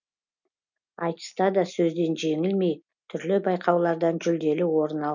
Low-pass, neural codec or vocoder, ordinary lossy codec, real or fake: 7.2 kHz; none; none; real